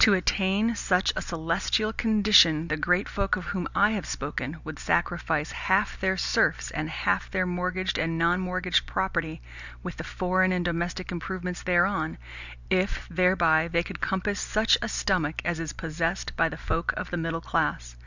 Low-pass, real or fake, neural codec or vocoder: 7.2 kHz; real; none